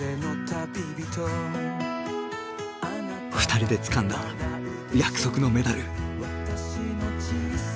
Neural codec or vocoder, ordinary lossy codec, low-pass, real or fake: none; none; none; real